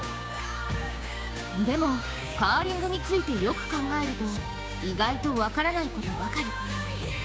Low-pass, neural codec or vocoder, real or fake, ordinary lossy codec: none; codec, 16 kHz, 6 kbps, DAC; fake; none